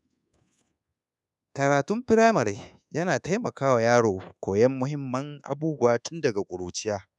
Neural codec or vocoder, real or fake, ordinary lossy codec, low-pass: codec, 24 kHz, 1.2 kbps, DualCodec; fake; none; none